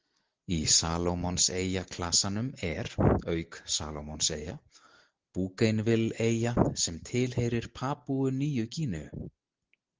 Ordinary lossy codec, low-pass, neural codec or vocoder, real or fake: Opus, 16 kbps; 7.2 kHz; none; real